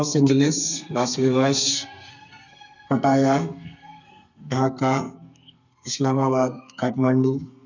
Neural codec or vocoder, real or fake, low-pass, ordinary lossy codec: codec, 32 kHz, 1.9 kbps, SNAC; fake; 7.2 kHz; none